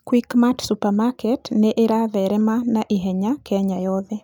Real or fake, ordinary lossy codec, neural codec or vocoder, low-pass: real; none; none; 19.8 kHz